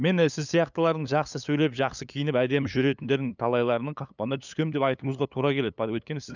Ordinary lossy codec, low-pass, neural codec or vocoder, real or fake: none; 7.2 kHz; codec, 16 kHz, 8 kbps, FunCodec, trained on LibriTTS, 25 frames a second; fake